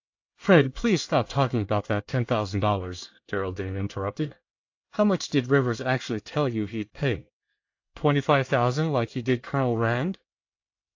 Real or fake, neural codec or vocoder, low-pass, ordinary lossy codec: fake; codec, 24 kHz, 1 kbps, SNAC; 7.2 kHz; AAC, 48 kbps